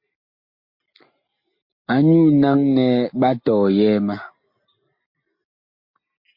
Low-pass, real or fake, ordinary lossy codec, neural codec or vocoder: 5.4 kHz; real; MP3, 32 kbps; none